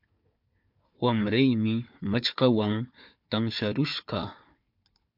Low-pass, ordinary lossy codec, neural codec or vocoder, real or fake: 5.4 kHz; MP3, 48 kbps; codec, 16 kHz, 4 kbps, FunCodec, trained on Chinese and English, 50 frames a second; fake